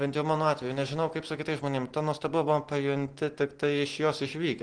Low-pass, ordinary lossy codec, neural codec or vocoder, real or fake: 9.9 kHz; Opus, 16 kbps; none; real